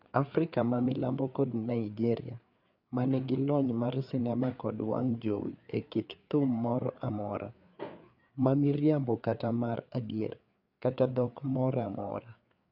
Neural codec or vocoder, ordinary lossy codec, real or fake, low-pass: codec, 16 kHz, 4 kbps, FreqCodec, larger model; none; fake; 5.4 kHz